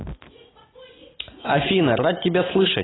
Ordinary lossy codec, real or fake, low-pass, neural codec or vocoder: AAC, 16 kbps; real; 7.2 kHz; none